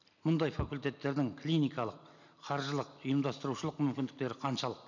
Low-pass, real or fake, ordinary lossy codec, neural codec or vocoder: 7.2 kHz; real; none; none